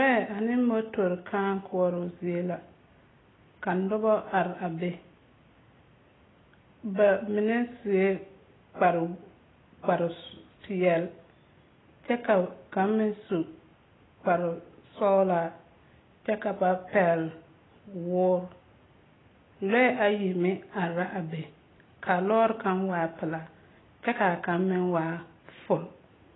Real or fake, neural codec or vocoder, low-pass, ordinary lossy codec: real; none; 7.2 kHz; AAC, 16 kbps